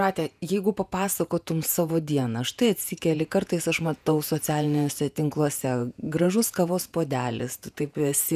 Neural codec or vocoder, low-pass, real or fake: vocoder, 48 kHz, 128 mel bands, Vocos; 14.4 kHz; fake